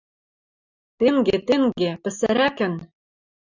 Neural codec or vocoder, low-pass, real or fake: none; 7.2 kHz; real